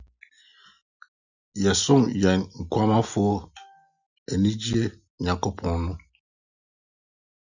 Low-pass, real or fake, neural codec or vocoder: 7.2 kHz; real; none